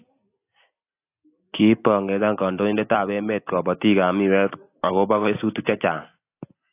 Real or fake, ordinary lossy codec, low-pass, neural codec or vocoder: real; AAC, 24 kbps; 3.6 kHz; none